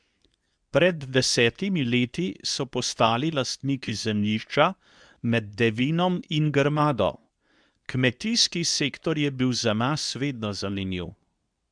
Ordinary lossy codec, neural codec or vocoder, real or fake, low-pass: none; codec, 24 kHz, 0.9 kbps, WavTokenizer, medium speech release version 2; fake; 9.9 kHz